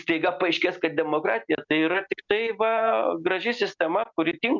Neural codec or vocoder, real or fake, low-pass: none; real; 7.2 kHz